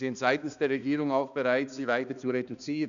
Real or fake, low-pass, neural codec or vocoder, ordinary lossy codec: fake; 7.2 kHz; codec, 16 kHz, 2 kbps, X-Codec, HuBERT features, trained on balanced general audio; none